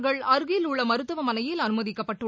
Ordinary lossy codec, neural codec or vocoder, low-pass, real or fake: none; none; none; real